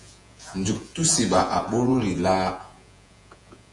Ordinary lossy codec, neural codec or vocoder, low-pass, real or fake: AAC, 64 kbps; vocoder, 48 kHz, 128 mel bands, Vocos; 10.8 kHz; fake